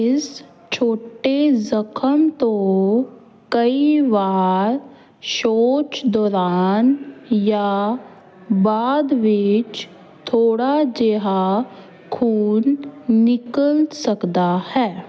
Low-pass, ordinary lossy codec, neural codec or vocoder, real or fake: none; none; none; real